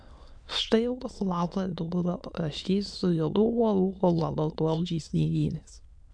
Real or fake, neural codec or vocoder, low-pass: fake; autoencoder, 22.05 kHz, a latent of 192 numbers a frame, VITS, trained on many speakers; 9.9 kHz